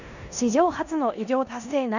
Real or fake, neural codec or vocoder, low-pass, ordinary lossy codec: fake; codec, 16 kHz in and 24 kHz out, 0.9 kbps, LongCat-Audio-Codec, four codebook decoder; 7.2 kHz; none